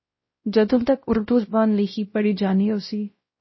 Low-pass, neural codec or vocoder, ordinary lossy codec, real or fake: 7.2 kHz; codec, 16 kHz, 0.5 kbps, X-Codec, WavLM features, trained on Multilingual LibriSpeech; MP3, 24 kbps; fake